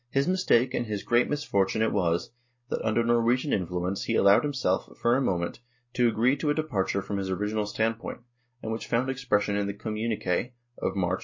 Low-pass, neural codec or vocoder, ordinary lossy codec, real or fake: 7.2 kHz; none; MP3, 32 kbps; real